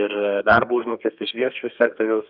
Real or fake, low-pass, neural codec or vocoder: fake; 5.4 kHz; codec, 32 kHz, 1.9 kbps, SNAC